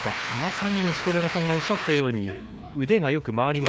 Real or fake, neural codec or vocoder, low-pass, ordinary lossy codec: fake; codec, 16 kHz, 2 kbps, FreqCodec, larger model; none; none